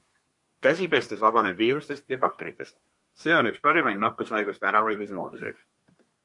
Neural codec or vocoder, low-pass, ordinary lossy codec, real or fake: codec, 24 kHz, 1 kbps, SNAC; 10.8 kHz; MP3, 48 kbps; fake